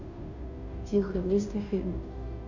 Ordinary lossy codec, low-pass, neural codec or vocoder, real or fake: none; 7.2 kHz; codec, 16 kHz, 0.5 kbps, FunCodec, trained on Chinese and English, 25 frames a second; fake